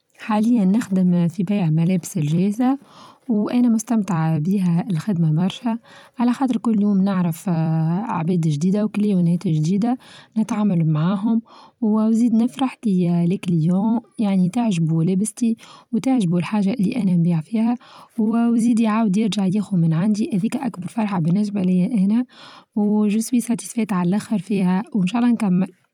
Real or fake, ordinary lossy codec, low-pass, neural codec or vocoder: fake; none; 19.8 kHz; vocoder, 44.1 kHz, 128 mel bands every 256 samples, BigVGAN v2